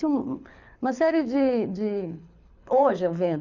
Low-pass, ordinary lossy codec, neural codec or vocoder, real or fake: 7.2 kHz; none; codec, 24 kHz, 6 kbps, HILCodec; fake